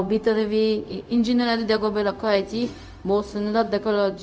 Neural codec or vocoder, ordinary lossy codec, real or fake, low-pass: codec, 16 kHz, 0.4 kbps, LongCat-Audio-Codec; none; fake; none